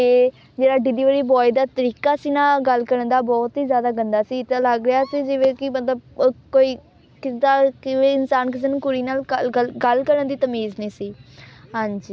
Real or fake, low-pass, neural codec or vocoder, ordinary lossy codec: real; none; none; none